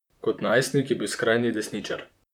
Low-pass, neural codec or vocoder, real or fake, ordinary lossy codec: 19.8 kHz; vocoder, 44.1 kHz, 128 mel bands, Pupu-Vocoder; fake; none